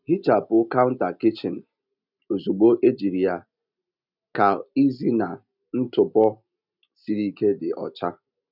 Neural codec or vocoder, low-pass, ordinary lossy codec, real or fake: none; 5.4 kHz; none; real